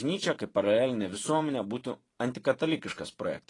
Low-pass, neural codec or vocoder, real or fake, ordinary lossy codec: 10.8 kHz; vocoder, 44.1 kHz, 128 mel bands every 512 samples, BigVGAN v2; fake; AAC, 32 kbps